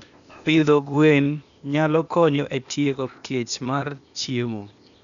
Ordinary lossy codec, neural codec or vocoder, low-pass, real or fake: none; codec, 16 kHz, 0.8 kbps, ZipCodec; 7.2 kHz; fake